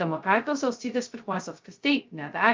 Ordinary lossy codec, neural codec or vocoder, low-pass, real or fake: Opus, 24 kbps; codec, 16 kHz, 0.2 kbps, FocalCodec; 7.2 kHz; fake